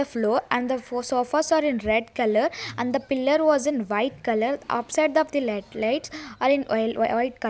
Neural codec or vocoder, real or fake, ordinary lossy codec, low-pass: none; real; none; none